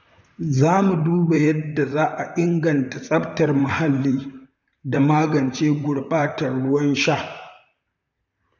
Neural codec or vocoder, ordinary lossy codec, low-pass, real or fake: vocoder, 44.1 kHz, 128 mel bands, Pupu-Vocoder; none; 7.2 kHz; fake